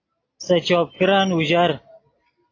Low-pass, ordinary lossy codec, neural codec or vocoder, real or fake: 7.2 kHz; AAC, 32 kbps; none; real